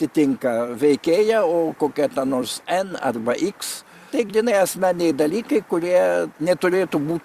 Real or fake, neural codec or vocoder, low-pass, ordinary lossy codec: fake; vocoder, 44.1 kHz, 128 mel bands every 256 samples, BigVGAN v2; 14.4 kHz; Opus, 64 kbps